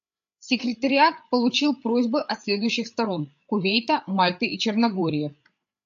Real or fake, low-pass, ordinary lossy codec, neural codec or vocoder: fake; 7.2 kHz; AAC, 64 kbps; codec, 16 kHz, 8 kbps, FreqCodec, larger model